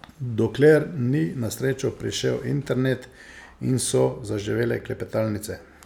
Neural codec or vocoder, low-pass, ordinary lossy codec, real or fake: none; 19.8 kHz; none; real